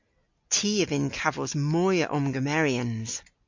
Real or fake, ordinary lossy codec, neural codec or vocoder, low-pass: real; MP3, 48 kbps; none; 7.2 kHz